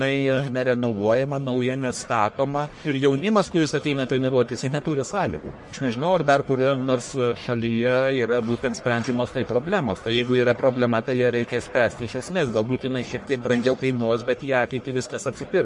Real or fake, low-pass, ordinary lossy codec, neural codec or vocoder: fake; 10.8 kHz; MP3, 48 kbps; codec, 44.1 kHz, 1.7 kbps, Pupu-Codec